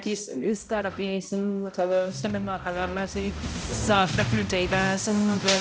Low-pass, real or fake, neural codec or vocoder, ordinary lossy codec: none; fake; codec, 16 kHz, 0.5 kbps, X-Codec, HuBERT features, trained on balanced general audio; none